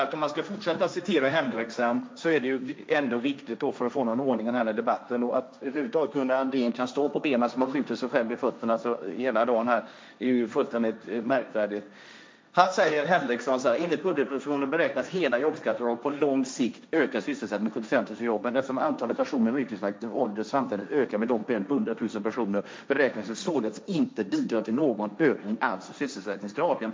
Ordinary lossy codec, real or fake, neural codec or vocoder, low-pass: none; fake; codec, 16 kHz, 1.1 kbps, Voila-Tokenizer; none